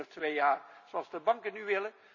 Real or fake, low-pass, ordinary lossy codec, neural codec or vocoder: real; 7.2 kHz; none; none